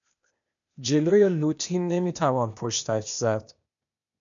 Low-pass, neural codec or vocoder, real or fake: 7.2 kHz; codec, 16 kHz, 0.8 kbps, ZipCodec; fake